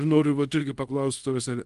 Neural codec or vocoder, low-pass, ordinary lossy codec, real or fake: codec, 24 kHz, 0.5 kbps, DualCodec; 10.8 kHz; Opus, 24 kbps; fake